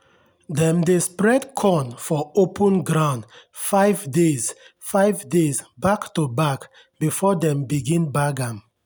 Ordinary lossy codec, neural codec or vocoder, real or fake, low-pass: none; none; real; none